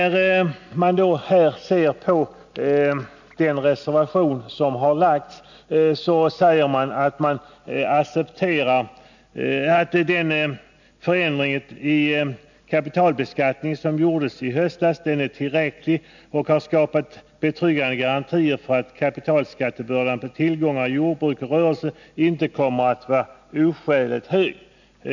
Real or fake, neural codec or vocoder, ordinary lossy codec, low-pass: real; none; none; 7.2 kHz